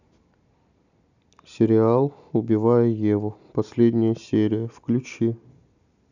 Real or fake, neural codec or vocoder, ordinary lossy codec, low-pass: real; none; none; 7.2 kHz